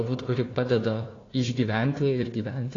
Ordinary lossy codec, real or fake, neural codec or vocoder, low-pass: AAC, 32 kbps; fake; codec, 16 kHz, 1 kbps, FunCodec, trained on Chinese and English, 50 frames a second; 7.2 kHz